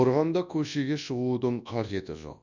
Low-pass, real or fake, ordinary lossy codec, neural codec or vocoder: 7.2 kHz; fake; none; codec, 24 kHz, 0.9 kbps, WavTokenizer, large speech release